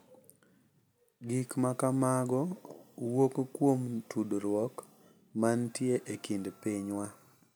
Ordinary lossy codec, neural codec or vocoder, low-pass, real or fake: none; none; none; real